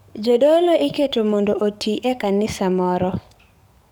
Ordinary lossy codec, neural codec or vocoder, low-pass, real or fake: none; codec, 44.1 kHz, 7.8 kbps, DAC; none; fake